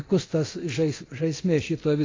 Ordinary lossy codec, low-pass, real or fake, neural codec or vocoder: AAC, 32 kbps; 7.2 kHz; fake; codec, 24 kHz, 0.9 kbps, DualCodec